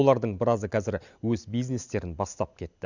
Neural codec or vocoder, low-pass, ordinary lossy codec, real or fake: none; 7.2 kHz; none; real